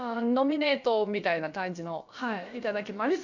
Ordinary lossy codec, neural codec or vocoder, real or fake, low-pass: none; codec, 16 kHz, about 1 kbps, DyCAST, with the encoder's durations; fake; 7.2 kHz